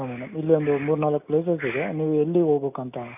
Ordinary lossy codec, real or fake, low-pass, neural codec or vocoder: none; real; 3.6 kHz; none